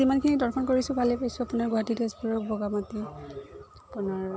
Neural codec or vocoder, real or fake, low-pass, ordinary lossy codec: none; real; none; none